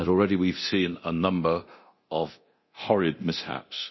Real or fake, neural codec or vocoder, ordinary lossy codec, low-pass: fake; codec, 24 kHz, 0.9 kbps, DualCodec; MP3, 24 kbps; 7.2 kHz